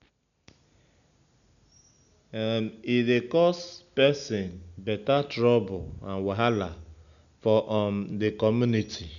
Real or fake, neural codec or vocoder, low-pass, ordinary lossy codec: real; none; 7.2 kHz; none